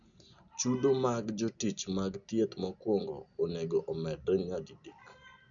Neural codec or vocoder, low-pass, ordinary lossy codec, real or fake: none; 7.2 kHz; none; real